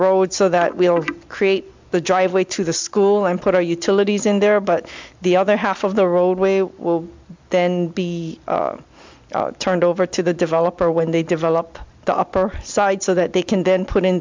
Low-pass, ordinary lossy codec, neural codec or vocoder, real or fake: 7.2 kHz; MP3, 64 kbps; none; real